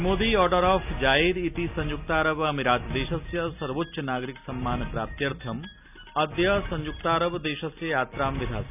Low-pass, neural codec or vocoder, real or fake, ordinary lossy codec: 3.6 kHz; none; real; none